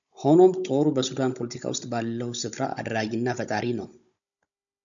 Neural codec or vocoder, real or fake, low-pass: codec, 16 kHz, 16 kbps, FunCodec, trained on Chinese and English, 50 frames a second; fake; 7.2 kHz